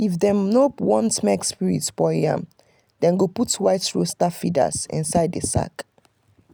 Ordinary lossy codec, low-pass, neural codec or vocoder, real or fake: none; none; none; real